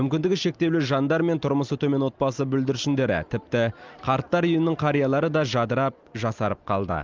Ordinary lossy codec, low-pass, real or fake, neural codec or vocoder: Opus, 24 kbps; 7.2 kHz; real; none